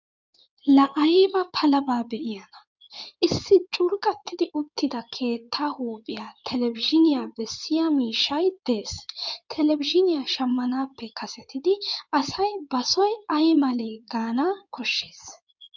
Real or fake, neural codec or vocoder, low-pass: fake; codec, 16 kHz in and 24 kHz out, 2.2 kbps, FireRedTTS-2 codec; 7.2 kHz